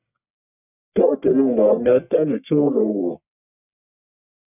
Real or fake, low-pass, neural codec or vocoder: fake; 3.6 kHz; codec, 44.1 kHz, 1.7 kbps, Pupu-Codec